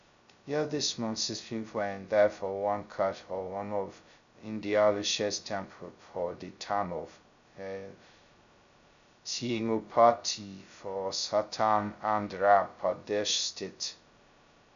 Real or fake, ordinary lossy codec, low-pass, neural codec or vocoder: fake; AAC, 96 kbps; 7.2 kHz; codec, 16 kHz, 0.2 kbps, FocalCodec